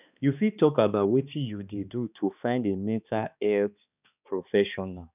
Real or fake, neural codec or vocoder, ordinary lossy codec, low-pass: fake; codec, 16 kHz, 2 kbps, X-Codec, HuBERT features, trained on balanced general audio; none; 3.6 kHz